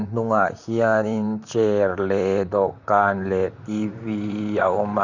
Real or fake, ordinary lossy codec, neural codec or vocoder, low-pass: fake; none; vocoder, 44.1 kHz, 128 mel bands, Pupu-Vocoder; 7.2 kHz